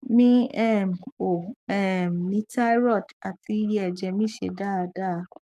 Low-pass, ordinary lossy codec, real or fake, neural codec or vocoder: 14.4 kHz; none; fake; codec, 44.1 kHz, 7.8 kbps, DAC